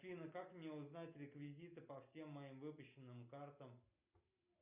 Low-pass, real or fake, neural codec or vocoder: 3.6 kHz; real; none